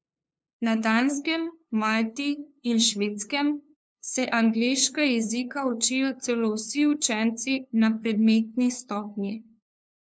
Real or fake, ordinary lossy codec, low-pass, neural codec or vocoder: fake; none; none; codec, 16 kHz, 2 kbps, FunCodec, trained on LibriTTS, 25 frames a second